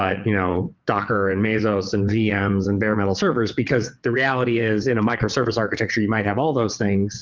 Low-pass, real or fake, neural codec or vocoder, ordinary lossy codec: 7.2 kHz; fake; vocoder, 22.05 kHz, 80 mel bands, WaveNeXt; Opus, 32 kbps